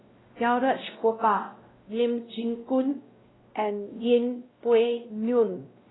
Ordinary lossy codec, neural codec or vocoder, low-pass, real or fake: AAC, 16 kbps; codec, 16 kHz, 0.5 kbps, X-Codec, WavLM features, trained on Multilingual LibriSpeech; 7.2 kHz; fake